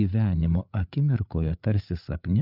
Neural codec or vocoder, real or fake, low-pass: codec, 16 kHz, 8 kbps, FreqCodec, larger model; fake; 5.4 kHz